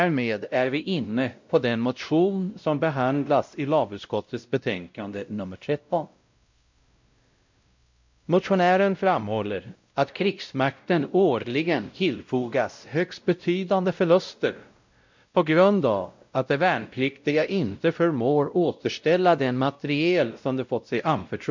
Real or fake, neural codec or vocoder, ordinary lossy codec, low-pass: fake; codec, 16 kHz, 0.5 kbps, X-Codec, WavLM features, trained on Multilingual LibriSpeech; MP3, 64 kbps; 7.2 kHz